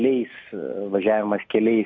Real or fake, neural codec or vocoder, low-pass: real; none; 7.2 kHz